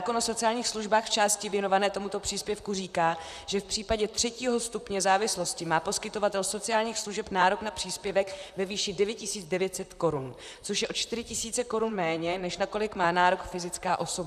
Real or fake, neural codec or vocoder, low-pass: fake; vocoder, 44.1 kHz, 128 mel bands, Pupu-Vocoder; 14.4 kHz